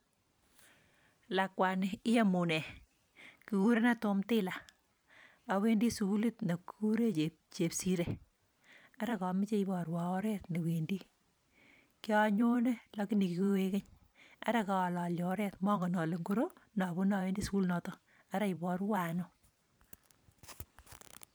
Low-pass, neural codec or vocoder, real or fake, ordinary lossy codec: none; vocoder, 44.1 kHz, 128 mel bands every 512 samples, BigVGAN v2; fake; none